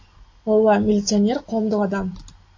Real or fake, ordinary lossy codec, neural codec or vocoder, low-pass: real; AAC, 48 kbps; none; 7.2 kHz